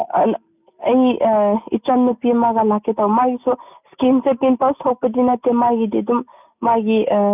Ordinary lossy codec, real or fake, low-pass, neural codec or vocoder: none; real; 3.6 kHz; none